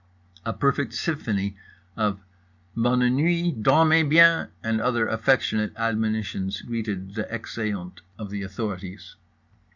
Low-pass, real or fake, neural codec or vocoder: 7.2 kHz; real; none